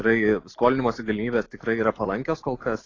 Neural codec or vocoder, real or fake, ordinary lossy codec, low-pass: none; real; AAC, 32 kbps; 7.2 kHz